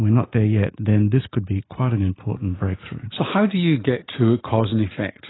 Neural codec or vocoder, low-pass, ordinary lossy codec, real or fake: none; 7.2 kHz; AAC, 16 kbps; real